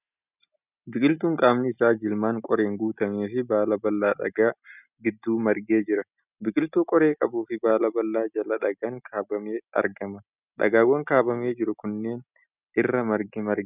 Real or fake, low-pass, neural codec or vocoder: real; 3.6 kHz; none